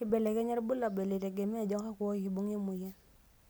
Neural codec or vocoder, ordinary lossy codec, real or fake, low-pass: none; none; real; none